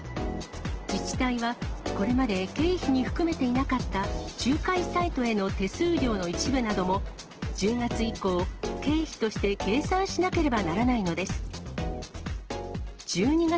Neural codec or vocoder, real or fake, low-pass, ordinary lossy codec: none; real; 7.2 kHz; Opus, 16 kbps